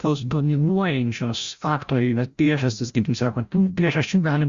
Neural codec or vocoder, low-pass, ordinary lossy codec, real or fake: codec, 16 kHz, 0.5 kbps, FreqCodec, larger model; 7.2 kHz; Opus, 64 kbps; fake